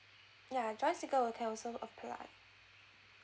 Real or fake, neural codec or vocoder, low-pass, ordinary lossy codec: real; none; none; none